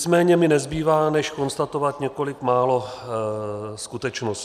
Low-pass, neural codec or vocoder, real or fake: 14.4 kHz; none; real